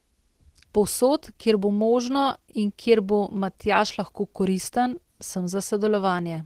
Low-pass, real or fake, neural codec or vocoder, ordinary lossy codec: 14.4 kHz; real; none; Opus, 16 kbps